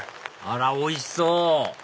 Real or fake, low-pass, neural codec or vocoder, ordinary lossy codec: real; none; none; none